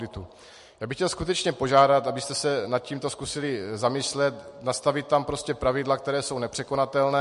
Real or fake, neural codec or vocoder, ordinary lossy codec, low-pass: real; none; MP3, 48 kbps; 14.4 kHz